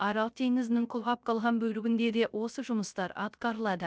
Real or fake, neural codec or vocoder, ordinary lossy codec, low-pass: fake; codec, 16 kHz, 0.3 kbps, FocalCodec; none; none